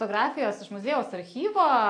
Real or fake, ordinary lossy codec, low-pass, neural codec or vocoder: real; AAC, 48 kbps; 9.9 kHz; none